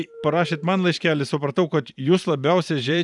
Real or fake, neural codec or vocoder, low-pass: fake; vocoder, 44.1 kHz, 128 mel bands every 512 samples, BigVGAN v2; 10.8 kHz